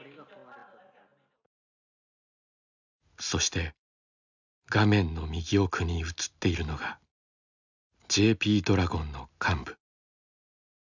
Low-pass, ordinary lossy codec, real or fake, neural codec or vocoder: 7.2 kHz; none; real; none